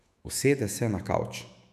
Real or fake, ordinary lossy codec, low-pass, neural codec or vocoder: fake; none; 14.4 kHz; autoencoder, 48 kHz, 128 numbers a frame, DAC-VAE, trained on Japanese speech